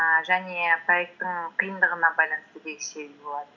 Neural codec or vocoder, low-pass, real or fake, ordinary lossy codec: none; 7.2 kHz; real; none